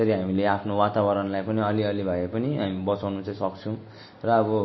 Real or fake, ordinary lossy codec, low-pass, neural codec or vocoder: real; MP3, 24 kbps; 7.2 kHz; none